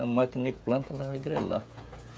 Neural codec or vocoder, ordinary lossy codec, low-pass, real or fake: codec, 16 kHz, 16 kbps, FreqCodec, smaller model; none; none; fake